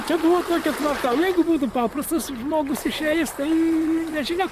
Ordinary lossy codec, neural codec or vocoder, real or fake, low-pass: Opus, 64 kbps; vocoder, 44.1 kHz, 128 mel bands, Pupu-Vocoder; fake; 14.4 kHz